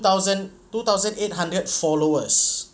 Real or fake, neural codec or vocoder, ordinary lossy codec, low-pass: real; none; none; none